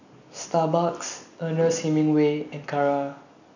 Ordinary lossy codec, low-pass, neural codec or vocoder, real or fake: none; 7.2 kHz; none; real